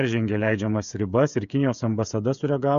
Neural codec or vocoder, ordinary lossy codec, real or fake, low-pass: codec, 16 kHz, 8 kbps, FreqCodec, smaller model; MP3, 96 kbps; fake; 7.2 kHz